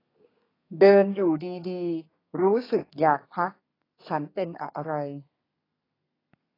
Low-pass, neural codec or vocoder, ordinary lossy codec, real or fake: 5.4 kHz; codec, 32 kHz, 1.9 kbps, SNAC; AAC, 24 kbps; fake